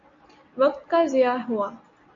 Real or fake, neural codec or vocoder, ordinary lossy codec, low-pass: real; none; AAC, 64 kbps; 7.2 kHz